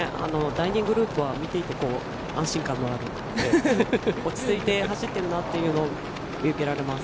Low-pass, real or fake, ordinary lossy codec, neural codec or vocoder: none; real; none; none